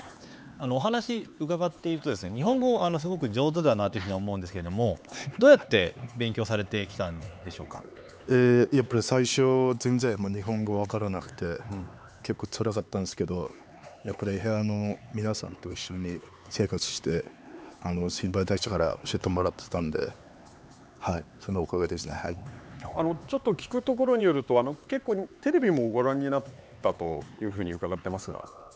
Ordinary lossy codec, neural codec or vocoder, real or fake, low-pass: none; codec, 16 kHz, 4 kbps, X-Codec, HuBERT features, trained on LibriSpeech; fake; none